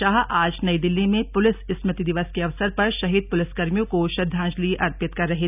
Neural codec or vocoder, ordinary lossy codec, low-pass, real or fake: none; none; 3.6 kHz; real